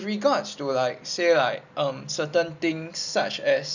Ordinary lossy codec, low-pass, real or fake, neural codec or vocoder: none; 7.2 kHz; real; none